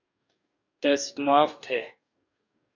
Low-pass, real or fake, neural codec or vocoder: 7.2 kHz; fake; codec, 44.1 kHz, 2.6 kbps, DAC